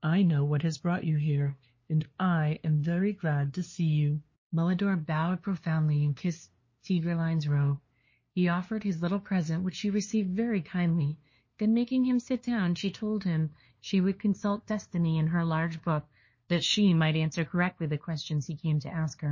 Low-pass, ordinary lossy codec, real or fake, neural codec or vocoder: 7.2 kHz; MP3, 32 kbps; fake; codec, 16 kHz, 2 kbps, FunCodec, trained on LibriTTS, 25 frames a second